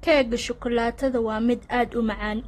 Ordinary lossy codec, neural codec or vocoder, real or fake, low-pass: AAC, 32 kbps; none; real; 19.8 kHz